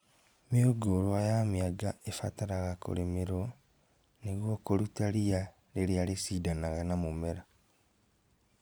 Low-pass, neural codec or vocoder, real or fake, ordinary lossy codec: none; vocoder, 44.1 kHz, 128 mel bands every 512 samples, BigVGAN v2; fake; none